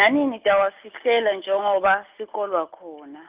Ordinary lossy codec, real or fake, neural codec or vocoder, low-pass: Opus, 32 kbps; real; none; 3.6 kHz